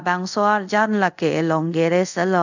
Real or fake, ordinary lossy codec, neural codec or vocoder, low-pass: fake; none; codec, 24 kHz, 0.5 kbps, DualCodec; 7.2 kHz